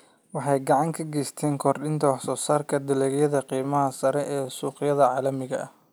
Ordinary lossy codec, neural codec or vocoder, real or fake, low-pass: none; none; real; none